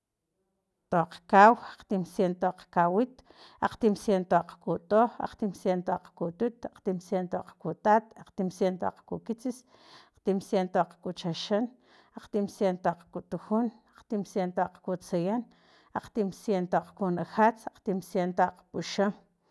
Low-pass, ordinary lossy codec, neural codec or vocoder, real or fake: none; none; none; real